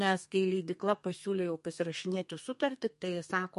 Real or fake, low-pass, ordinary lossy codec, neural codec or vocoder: fake; 14.4 kHz; MP3, 48 kbps; codec, 32 kHz, 1.9 kbps, SNAC